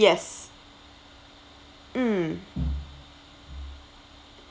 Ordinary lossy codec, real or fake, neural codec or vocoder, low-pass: none; real; none; none